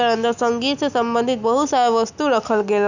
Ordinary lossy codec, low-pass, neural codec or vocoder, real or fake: none; 7.2 kHz; none; real